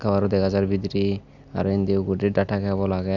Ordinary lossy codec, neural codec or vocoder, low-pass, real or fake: Opus, 64 kbps; none; 7.2 kHz; real